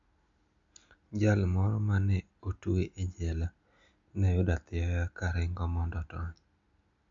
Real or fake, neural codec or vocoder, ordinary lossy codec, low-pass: real; none; MP3, 64 kbps; 7.2 kHz